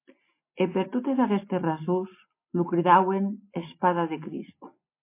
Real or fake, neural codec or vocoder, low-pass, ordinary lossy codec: real; none; 3.6 kHz; MP3, 24 kbps